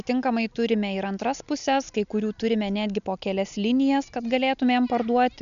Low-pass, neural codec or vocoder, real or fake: 7.2 kHz; none; real